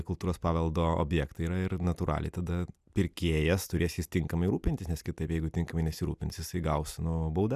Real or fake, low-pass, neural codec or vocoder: real; 14.4 kHz; none